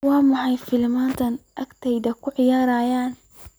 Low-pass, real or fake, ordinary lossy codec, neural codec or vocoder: none; real; none; none